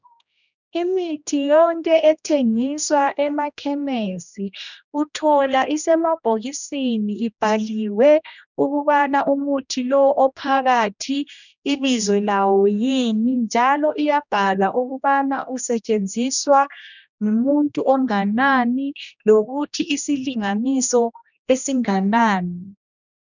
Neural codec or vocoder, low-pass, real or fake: codec, 16 kHz, 1 kbps, X-Codec, HuBERT features, trained on general audio; 7.2 kHz; fake